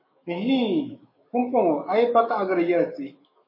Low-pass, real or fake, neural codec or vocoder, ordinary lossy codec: 5.4 kHz; fake; autoencoder, 48 kHz, 128 numbers a frame, DAC-VAE, trained on Japanese speech; MP3, 24 kbps